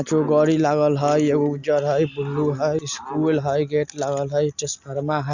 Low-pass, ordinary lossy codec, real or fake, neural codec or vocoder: 7.2 kHz; Opus, 64 kbps; real; none